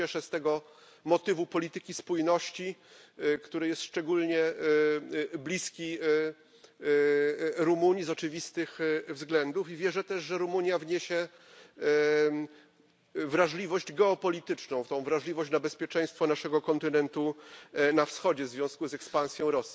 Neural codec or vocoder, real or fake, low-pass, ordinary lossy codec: none; real; none; none